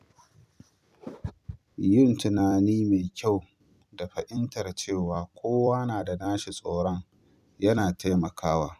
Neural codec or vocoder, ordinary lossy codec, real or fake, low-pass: vocoder, 44.1 kHz, 128 mel bands every 256 samples, BigVGAN v2; none; fake; 14.4 kHz